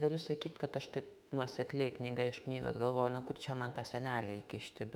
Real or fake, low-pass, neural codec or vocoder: fake; 19.8 kHz; autoencoder, 48 kHz, 32 numbers a frame, DAC-VAE, trained on Japanese speech